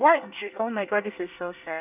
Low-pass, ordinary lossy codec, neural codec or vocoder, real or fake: 3.6 kHz; none; codec, 24 kHz, 1 kbps, SNAC; fake